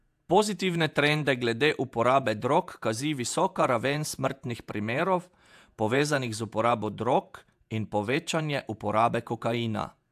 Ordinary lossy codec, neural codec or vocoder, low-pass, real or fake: AAC, 96 kbps; vocoder, 48 kHz, 128 mel bands, Vocos; 14.4 kHz; fake